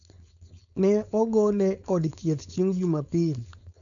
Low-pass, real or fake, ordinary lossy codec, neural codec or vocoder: 7.2 kHz; fake; none; codec, 16 kHz, 4.8 kbps, FACodec